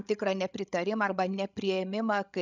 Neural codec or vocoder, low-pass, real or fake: codec, 16 kHz, 16 kbps, FreqCodec, larger model; 7.2 kHz; fake